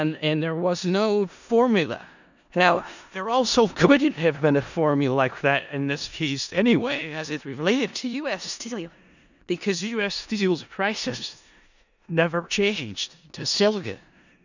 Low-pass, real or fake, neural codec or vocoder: 7.2 kHz; fake; codec, 16 kHz in and 24 kHz out, 0.4 kbps, LongCat-Audio-Codec, four codebook decoder